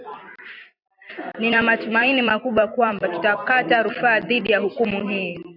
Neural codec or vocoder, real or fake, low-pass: vocoder, 44.1 kHz, 128 mel bands every 256 samples, BigVGAN v2; fake; 5.4 kHz